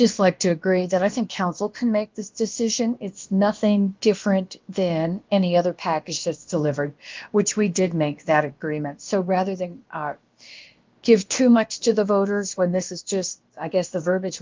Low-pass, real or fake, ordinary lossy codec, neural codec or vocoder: 7.2 kHz; fake; Opus, 32 kbps; codec, 16 kHz, about 1 kbps, DyCAST, with the encoder's durations